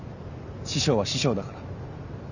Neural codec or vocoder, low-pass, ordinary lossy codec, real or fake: none; 7.2 kHz; none; real